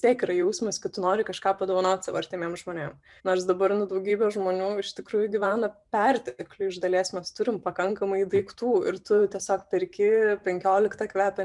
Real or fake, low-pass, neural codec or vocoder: fake; 10.8 kHz; vocoder, 44.1 kHz, 128 mel bands every 512 samples, BigVGAN v2